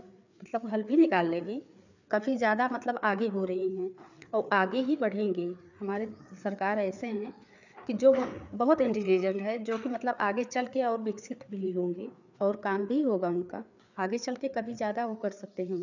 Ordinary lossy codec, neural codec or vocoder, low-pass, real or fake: none; codec, 16 kHz, 4 kbps, FreqCodec, larger model; 7.2 kHz; fake